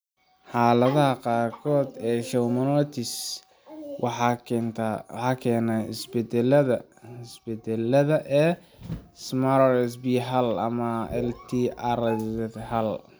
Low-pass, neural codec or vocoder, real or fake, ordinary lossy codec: none; none; real; none